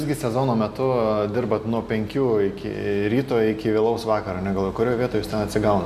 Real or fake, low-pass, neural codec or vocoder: real; 14.4 kHz; none